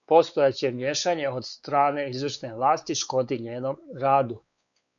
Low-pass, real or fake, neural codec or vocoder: 7.2 kHz; fake; codec, 16 kHz, 4 kbps, X-Codec, WavLM features, trained on Multilingual LibriSpeech